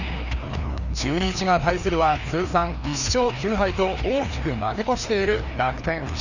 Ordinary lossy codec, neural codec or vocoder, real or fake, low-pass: none; codec, 16 kHz, 2 kbps, FreqCodec, larger model; fake; 7.2 kHz